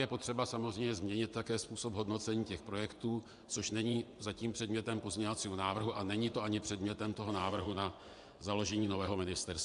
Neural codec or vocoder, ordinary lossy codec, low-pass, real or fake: vocoder, 24 kHz, 100 mel bands, Vocos; AAC, 96 kbps; 10.8 kHz; fake